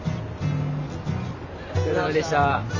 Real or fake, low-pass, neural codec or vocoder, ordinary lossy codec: real; 7.2 kHz; none; none